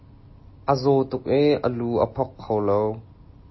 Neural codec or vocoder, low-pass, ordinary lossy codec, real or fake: none; 7.2 kHz; MP3, 24 kbps; real